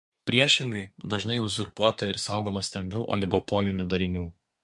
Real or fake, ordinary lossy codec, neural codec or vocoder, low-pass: fake; MP3, 64 kbps; codec, 24 kHz, 1 kbps, SNAC; 10.8 kHz